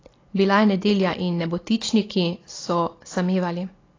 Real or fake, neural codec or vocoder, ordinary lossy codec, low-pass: real; none; AAC, 32 kbps; 7.2 kHz